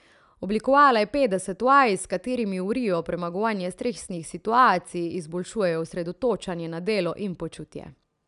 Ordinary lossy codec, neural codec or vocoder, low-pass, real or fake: none; none; 10.8 kHz; real